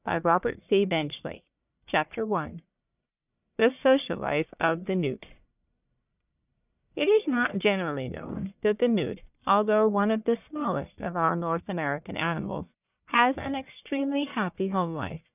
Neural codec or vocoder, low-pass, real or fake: codec, 44.1 kHz, 1.7 kbps, Pupu-Codec; 3.6 kHz; fake